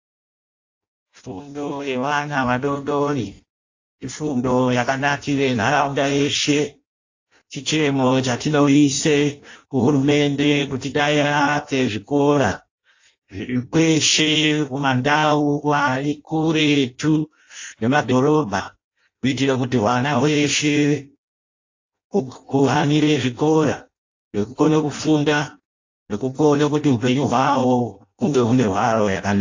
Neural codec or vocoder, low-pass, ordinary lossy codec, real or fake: codec, 16 kHz in and 24 kHz out, 0.6 kbps, FireRedTTS-2 codec; 7.2 kHz; AAC, 48 kbps; fake